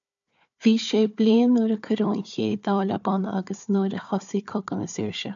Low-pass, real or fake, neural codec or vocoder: 7.2 kHz; fake; codec, 16 kHz, 4 kbps, FunCodec, trained on Chinese and English, 50 frames a second